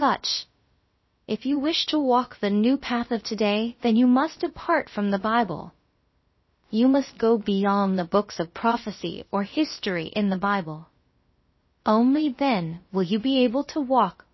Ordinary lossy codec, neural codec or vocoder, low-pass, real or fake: MP3, 24 kbps; codec, 16 kHz, about 1 kbps, DyCAST, with the encoder's durations; 7.2 kHz; fake